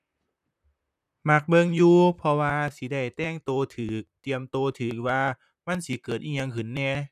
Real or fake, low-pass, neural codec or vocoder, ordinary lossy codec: fake; 14.4 kHz; vocoder, 44.1 kHz, 128 mel bands, Pupu-Vocoder; none